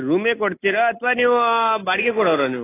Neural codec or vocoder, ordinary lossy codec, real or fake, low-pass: none; AAC, 16 kbps; real; 3.6 kHz